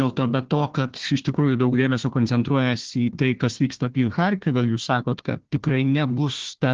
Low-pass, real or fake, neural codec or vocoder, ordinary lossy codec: 7.2 kHz; fake; codec, 16 kHz, 1 kbps, FunCodec, trained on Chinese and English, 50 frames a second; Opus, 16 kbps